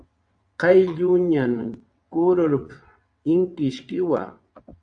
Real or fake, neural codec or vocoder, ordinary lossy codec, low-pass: fake; vocoder, 22.05 kHz, 80 mel bands, WaveNeXt; AAC, 64 kbps; 9.9 kHz